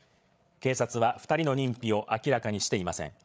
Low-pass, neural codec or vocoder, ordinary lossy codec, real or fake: none; codec, 16 kHz, 8 kbps, FreqCodec, larger model; none; fake